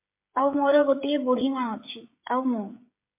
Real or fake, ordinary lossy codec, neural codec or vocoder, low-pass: fake; MP3, 24 kbps; codec, 16 kHz, 16 kbps, FreqCodec, smaller model; 3.6 kHz